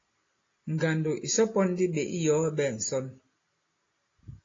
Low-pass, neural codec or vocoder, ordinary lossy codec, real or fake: 7.2 kHz; none; AAC, 32 kbps; real